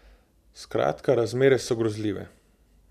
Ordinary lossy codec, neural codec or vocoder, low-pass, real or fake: none; none; 14.4 kHz; real